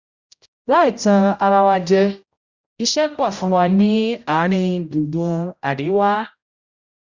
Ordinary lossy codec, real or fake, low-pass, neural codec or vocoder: Opus, 64 kbps; fake; 7.2 kHz; codec, 16 kHz, 0.5 kbps, X-Codec, HuBERT features, trained on general audio